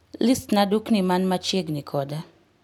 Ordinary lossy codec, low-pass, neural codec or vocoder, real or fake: none; 19.8 kHz; none; real